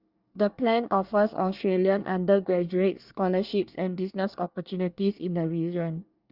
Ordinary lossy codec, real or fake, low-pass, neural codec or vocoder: Opus, 64 kbps; fake; 5.4 kHz; codec, 24 kHz, 1 kbps, SNAC